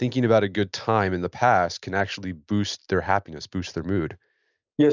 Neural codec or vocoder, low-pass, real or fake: none; 7.2 kHz; real